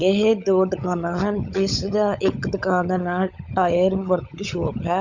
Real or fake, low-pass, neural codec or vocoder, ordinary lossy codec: fake; 7.2 kHz; codec, 16 kHz, 16 kbps, FunCodec, trained on LibriTTS, 50 frames a second; none